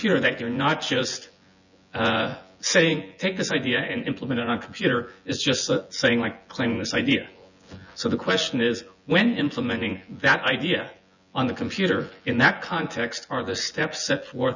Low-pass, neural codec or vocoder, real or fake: 7.2 kHz; vocoder, 24 kHz, 100 mel bands, Vocos; fake